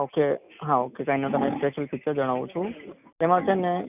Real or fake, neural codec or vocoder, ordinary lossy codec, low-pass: real; none; none; 3.6 kHz